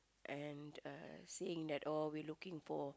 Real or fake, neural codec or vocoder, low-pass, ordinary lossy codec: real; none; none; none